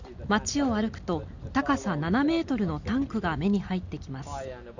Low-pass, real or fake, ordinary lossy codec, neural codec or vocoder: 7.2 kHz; real; Opus, 64 kbps; none